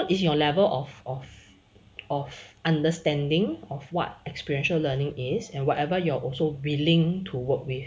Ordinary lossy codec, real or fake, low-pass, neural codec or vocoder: none; real; none; none